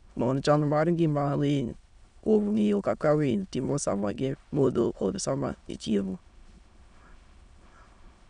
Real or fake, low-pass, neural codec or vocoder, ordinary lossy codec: fake; 9.9 kHz; autoencoder, 22.05 kHz, a latent of 192 numbers a frame, VITS, trained on many speakers; none